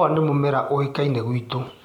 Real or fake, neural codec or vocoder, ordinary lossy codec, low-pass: real; none; none; 19.8 kHz